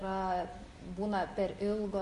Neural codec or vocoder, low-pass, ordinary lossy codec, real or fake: none; 14.4 kHz; MP3, 48 kbps; real